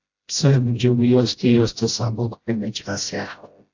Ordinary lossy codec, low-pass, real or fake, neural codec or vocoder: AAC, 48 kbps; 7.2 kHz; fake; codec, 16 kHz, 0.5 kbps, FreqCodec, smaller model